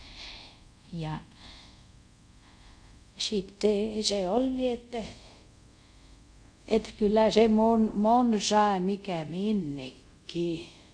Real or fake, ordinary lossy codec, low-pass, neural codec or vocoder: fake; none; 9.9 kHz; codec, 24 kHz, 0.5 kbps, DualCodec